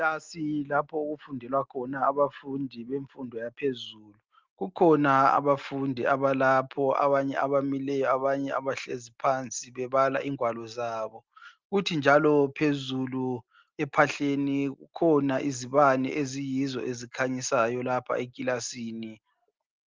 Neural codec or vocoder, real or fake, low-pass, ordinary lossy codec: none; real; 7.2 kHz; Opus, 32 kbps